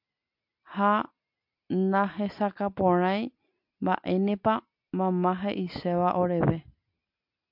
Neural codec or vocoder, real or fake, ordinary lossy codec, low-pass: none; real; MP3, 48 kbps; 5.4 kHz